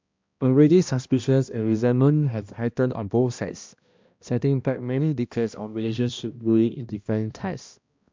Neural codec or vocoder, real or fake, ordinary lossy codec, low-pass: codec, 16 kHz, 1 kbps, X-Codec, HuBERT features, trained on balanced general audio; fake; MP3, 64 kbps; 7.2 kHz